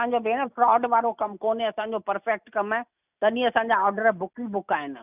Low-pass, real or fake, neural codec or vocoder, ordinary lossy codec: 3.6 kHz; real; none; none